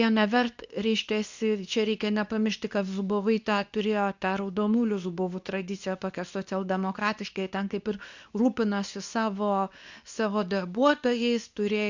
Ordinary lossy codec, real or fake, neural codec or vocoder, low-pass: Opus, 64 kbps; fake; codec, 24 kHz, 0.9 kbps, WavTokenizer, medium speech release version 2; 7.2 kHz